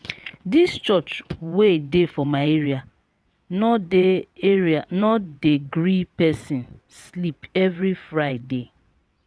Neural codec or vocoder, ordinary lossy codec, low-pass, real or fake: vocoder, 22.05 kHz, 80 mel bands, WaveNeXt; none; none; fake